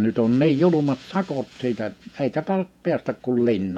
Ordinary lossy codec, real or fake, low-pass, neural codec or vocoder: none; fake; 19.8 kHz; vocoder, 48 kHz, 128 mel bands, Vocos